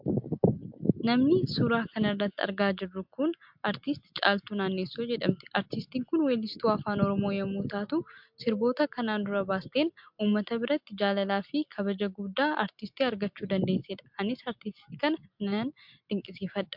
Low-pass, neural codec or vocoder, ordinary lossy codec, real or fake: 5.4 kHz; none; MP3, 48 kbps; real